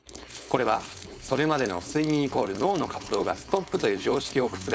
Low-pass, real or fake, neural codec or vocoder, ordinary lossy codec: none; fake; codec, 16 kHz, 4.8 kbps, FACodec; none